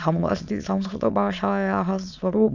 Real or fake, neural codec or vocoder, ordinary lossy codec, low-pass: fake; autoencoder, 22.05 kHz, a latent of 192 numbers a frame, VITS, trained on many speakers; none; 7.2 kHz